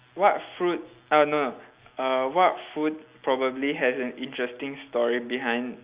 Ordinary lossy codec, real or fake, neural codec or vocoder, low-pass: Opus, 64 kbps; real; none; 3.6 kHz